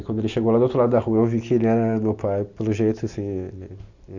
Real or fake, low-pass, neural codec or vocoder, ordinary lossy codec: real; 7.2 kHz; none; none